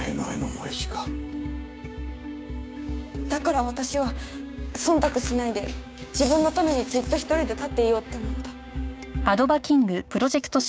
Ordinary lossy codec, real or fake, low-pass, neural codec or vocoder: none; fake; none; codec, 16 kHz, 6 kbps, DAC